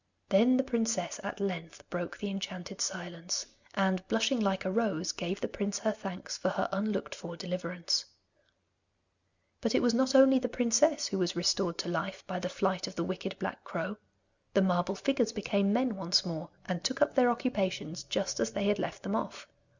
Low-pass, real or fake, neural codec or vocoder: 7.2 kHz; real; none